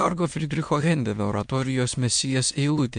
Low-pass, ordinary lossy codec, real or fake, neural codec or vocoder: 9.9 kHz; MP3, 64 kbps; fake; autoencoder, 22.05 kHz, a latent of 192 numbers a frame, VITS, trained on many speakers